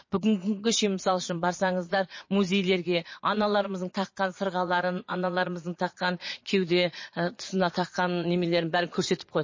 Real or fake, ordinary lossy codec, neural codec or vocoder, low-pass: fake; MP3, 32 kbps; vocoder, 22.05 kHz, 80 mel bands, Vocos; 7.2 kHz